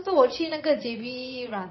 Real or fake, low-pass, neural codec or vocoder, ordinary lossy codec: fake; 7.2 kHz; vocoder, 22.05 kHz, 80 mel bands, Vocos; MP3, 24 kbps